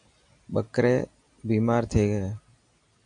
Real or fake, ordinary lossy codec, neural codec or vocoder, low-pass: real; MP3, 96 kbps; none; 9.9 kHz